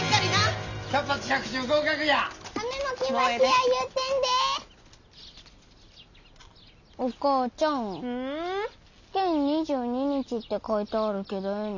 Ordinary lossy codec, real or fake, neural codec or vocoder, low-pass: none; real; none; 7.2 kHz